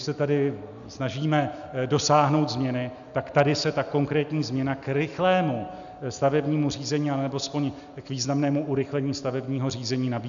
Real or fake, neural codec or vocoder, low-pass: real; none; 7.2 kHz